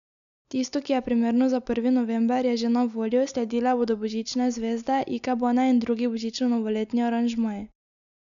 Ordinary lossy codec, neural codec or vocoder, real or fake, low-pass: none; none; real; 7.2 kHz